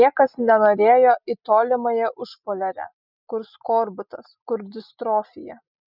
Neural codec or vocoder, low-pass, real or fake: none; 5.4 kHz; real